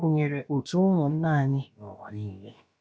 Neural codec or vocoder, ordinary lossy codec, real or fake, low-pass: codec, 16 kHz, about 1 kbps, DyCAST, with the encoder's durations; none; fake; none